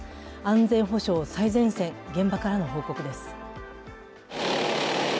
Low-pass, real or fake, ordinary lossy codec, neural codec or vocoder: none; real; none; none